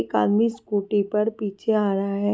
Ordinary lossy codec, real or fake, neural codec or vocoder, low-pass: none; real; none; none